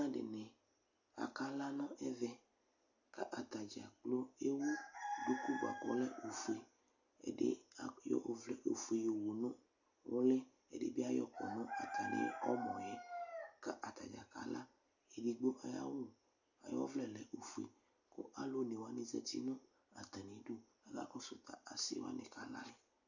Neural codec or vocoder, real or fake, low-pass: none; real; 7.2 kHz